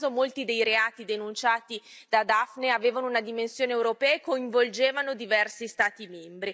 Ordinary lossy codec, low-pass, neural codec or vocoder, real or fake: none; none; none; real